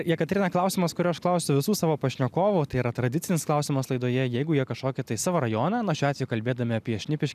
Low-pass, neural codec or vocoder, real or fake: 14.4 kHz; none; real